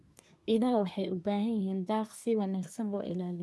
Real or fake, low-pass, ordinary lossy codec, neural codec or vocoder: fake; none; none; codec, 24 kHz, 1 kbps, SNAC